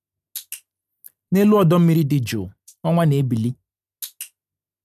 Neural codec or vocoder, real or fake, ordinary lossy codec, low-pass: none; real; none; 14.4 kHz